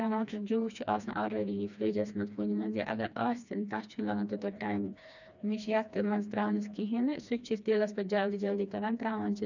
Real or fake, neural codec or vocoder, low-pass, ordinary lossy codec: fake; codec, 16 kHz, 2 kbps, FreqCodec, smaller model; 7.2 kHz; none